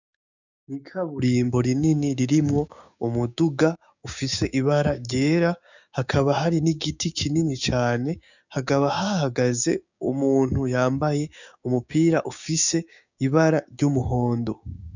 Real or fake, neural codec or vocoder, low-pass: fake; codec, 16 kHz, 6 kbps, DAC; 7.2 kHz